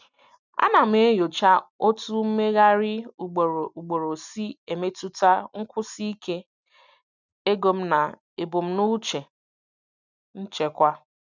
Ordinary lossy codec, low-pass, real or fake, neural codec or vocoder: none; 7.2 kHz; real; none